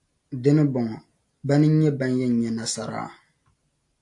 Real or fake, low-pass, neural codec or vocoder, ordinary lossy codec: real; 10.8 kHz; none; AAC, 64 kbps